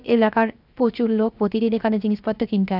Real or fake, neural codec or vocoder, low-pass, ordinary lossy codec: fake; codec, 16 kHz, 0.7 kbps, FocalCodec; 5.4 kHz; none